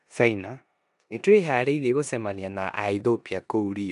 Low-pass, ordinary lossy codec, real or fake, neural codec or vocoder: 10.8 kHz; none; fake; codec, 16 kHz in and 24 kHz out, 0.9 kbps, LongCat-Audio-Codec, four codebook decoder